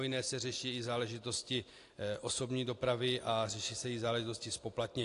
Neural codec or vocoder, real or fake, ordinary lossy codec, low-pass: none; real; AAC, 48 kbps; 10.8 kHz